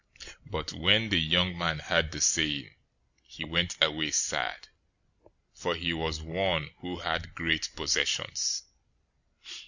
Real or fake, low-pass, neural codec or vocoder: real; 7.2 kHz; none